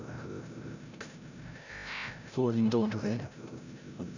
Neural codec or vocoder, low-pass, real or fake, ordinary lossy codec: codec, 16 kHz, 0.5 kbps, FreqCodec, larger model; 7.2 kHz; fake; none